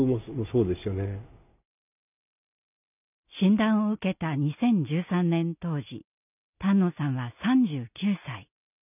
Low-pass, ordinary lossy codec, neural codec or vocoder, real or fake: 3.6 kHz; none; none; real